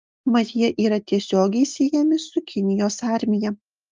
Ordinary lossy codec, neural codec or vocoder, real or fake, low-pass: Opus, 24 kbps; none; real; 7.2 kHz